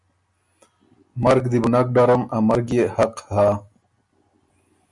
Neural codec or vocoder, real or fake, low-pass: none; real; 10.8 kHz